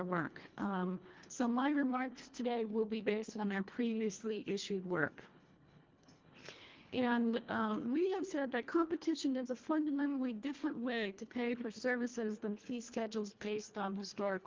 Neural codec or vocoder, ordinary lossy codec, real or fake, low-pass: codec, 24 kHz, 1.5 kbps, HILCodec; Opus, 32 kbps; fake; 7.2 kHz